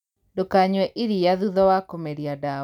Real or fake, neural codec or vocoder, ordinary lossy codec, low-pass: real; none; none; 19.8 kHz